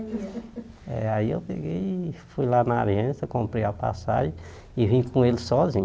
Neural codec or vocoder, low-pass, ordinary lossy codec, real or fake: none; none; none; real